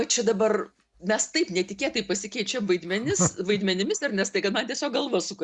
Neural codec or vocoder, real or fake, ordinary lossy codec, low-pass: none; real; Opus, 64 kbps; 10.8 kHz